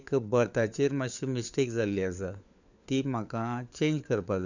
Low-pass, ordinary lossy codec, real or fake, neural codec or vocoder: 7.2 kHz; none; fake; codec, 16 kHz, 4 kbps, FunCodec, trained on LibriTTS, 50 frames a second